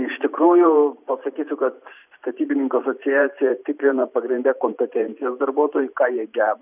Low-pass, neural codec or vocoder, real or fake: 3.6 kHz; vocoder, 44.1 kHz, 128 mel bands every 512 samples, BigVGAN v2; fake